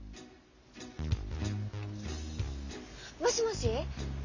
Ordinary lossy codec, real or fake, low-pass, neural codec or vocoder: none; real; 7.2 kHz; none